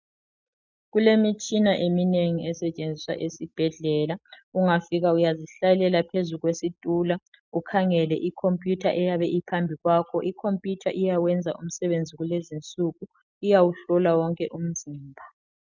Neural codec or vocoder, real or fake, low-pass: none; real; 7.2 kHz